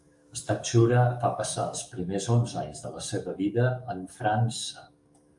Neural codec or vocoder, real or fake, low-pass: codec, 44.1 kHz, 7.8 kbps, DAC; fake; 10.8 kHz